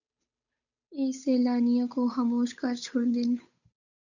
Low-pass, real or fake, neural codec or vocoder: 7.2 kHz; fake; codec, 16 kHz, 8 kbps, FunCodec, trained on Chinese and English, 25 frames a second